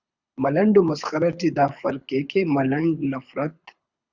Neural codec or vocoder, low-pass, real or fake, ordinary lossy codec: codec, 24 kHz, 6 kbps, HILCodec; 7.2 kHz; fake; Opus, 64 kbps